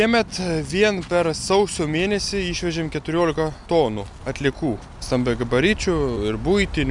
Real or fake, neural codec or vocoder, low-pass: real; none; 10.8 kHz